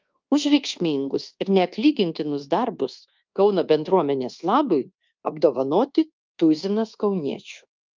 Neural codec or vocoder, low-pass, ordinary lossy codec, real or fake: codec, 24 kHz, 1.2 kbps, DualCodec; 7.2 kHz; Opus, 24 kbps; fake